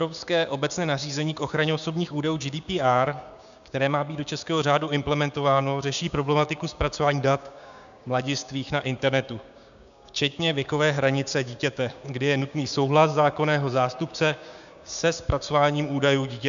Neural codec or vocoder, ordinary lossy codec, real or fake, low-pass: codec, 16 kHz, 6 kbps, DAC; MP3, 96 kbps; fake; 7.2 kHz